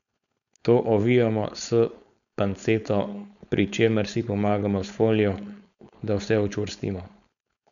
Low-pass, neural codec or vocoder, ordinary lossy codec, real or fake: 7.2 kHz; codec, 16 kHz, 4.8 kbps, FACodec; none; fake